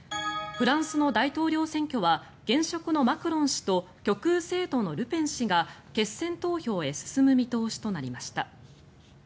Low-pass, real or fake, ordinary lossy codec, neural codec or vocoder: none; real; none; none